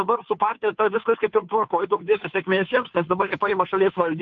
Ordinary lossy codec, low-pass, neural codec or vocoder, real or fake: AAC, 64 kbps; 7.2 kHz; codec, 16 kHz, 2 kbps, FunCodec, trained on Chinese and English, 25 frames a second; fake